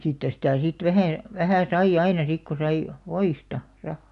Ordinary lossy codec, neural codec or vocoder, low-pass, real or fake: none; none; 10.8 kHz; real